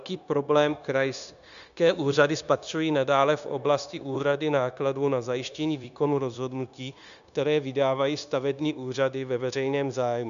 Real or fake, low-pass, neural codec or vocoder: fake; 7.2 kHz; codec, 16 kHz, 0.9 kbps, LongCat-Audio-Codec